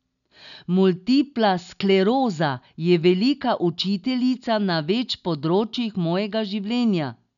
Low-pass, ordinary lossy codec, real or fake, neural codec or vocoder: 7.2 kHz; none; real; none